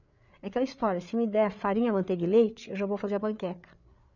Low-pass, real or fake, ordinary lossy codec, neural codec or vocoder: 7.2 kHz; fake; none; codec, 16 kHz, 8 kbps, FreqCodec, larger model